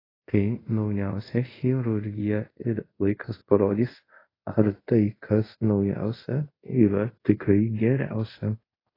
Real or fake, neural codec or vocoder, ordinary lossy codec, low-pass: fake; codec, 24 kHz, 0.5 kbps, DualCodec; AAC, 24 kbps; 5.4 kHz